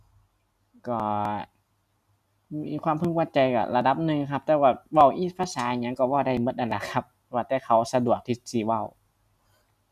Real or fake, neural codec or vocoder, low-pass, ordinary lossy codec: real; none; 14.4 kHz; none